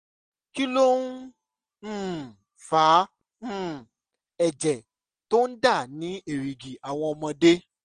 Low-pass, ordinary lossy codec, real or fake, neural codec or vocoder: 9.9 kHz; Opus, 24 kbps; real; none